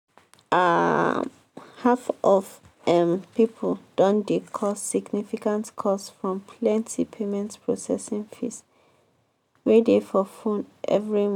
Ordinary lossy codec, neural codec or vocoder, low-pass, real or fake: none; none; 19.8 kHz; real